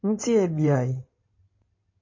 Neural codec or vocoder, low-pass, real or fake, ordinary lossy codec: codec, 16 kHz in and 24 kHz out, 2.2 kbps, FireRedTTS-2 codec; 7.2 kHz; fake; MP3, 32 kbps